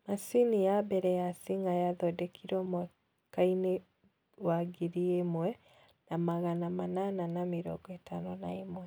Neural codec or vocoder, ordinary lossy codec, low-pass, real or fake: none; none; none; real